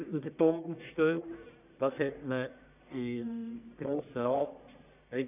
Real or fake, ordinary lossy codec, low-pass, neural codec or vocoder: fake; none; 3.6 kHz; codec, 44.1 kHz, 1.7 kbps, Pupu-Codec